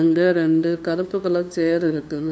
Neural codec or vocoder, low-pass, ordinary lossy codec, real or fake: codec, 16 kHz, 2 kbps, FunCodec, trained on LibriTTS, 25 frames a second; none; none; fake